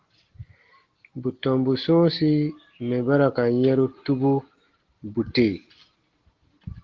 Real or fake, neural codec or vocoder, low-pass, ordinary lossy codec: real; none; 7.2 kHz; Opus, 16 kbps